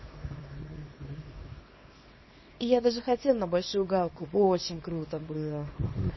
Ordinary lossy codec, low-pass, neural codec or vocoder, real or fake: MP3, 24 kbps; 7.2 kHz; codec, 24 kHz, 0.9 kbps, WavTokenizer, small release; fake